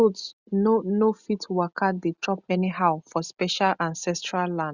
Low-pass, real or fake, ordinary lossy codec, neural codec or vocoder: 7.2 kHz; real; Opus, 64 kbps; none